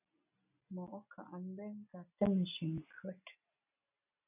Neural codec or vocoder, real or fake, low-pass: none; real; 3.6 kHz